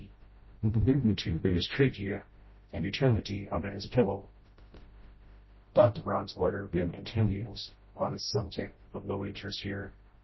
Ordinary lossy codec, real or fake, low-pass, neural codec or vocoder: MP3, 24 kbps; fake; 7.2 kHz; codec, 16 kHz, 0.5 kbps, FreqCodec, smaller model